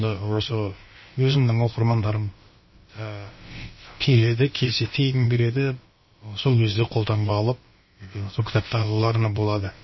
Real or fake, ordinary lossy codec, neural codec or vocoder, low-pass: fake; MP3, 24 kbps; codec, 16 kHz, about 1 kbps, DyCAST, with the encoder's durations; 7.2 kHz